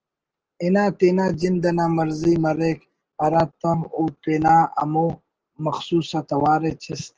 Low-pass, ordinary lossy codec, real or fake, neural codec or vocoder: 7.2 kHz; Opus, 16 kbps; real; none